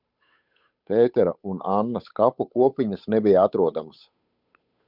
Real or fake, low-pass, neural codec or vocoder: fake; 5.4 kHz; codec, 16 kHz, 8 kbps, FunCodec, trained on Chinese and English, 25 frames a second